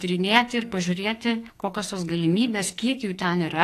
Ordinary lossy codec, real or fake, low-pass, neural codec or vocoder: AAC, 64 kbps; fake; 14.4 kHz; codec, 44.1 kHz, 2.6 kbps, SNAC